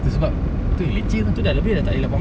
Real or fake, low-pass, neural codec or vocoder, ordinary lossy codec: real; none; none; none